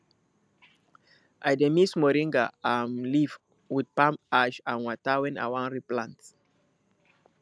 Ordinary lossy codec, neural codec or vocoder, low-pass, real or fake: none; none; none; real